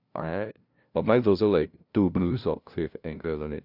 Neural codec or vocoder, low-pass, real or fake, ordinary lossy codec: codec, 16 kHz, 0.5 kbps, FunCodec, trained on LibriTTS, 25 frames a second; 5.4 kHz; fake; none